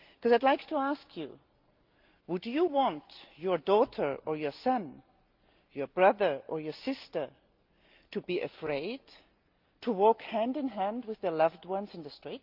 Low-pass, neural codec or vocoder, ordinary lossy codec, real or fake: 5.4 kHz; none; Opus, 32 kbps; real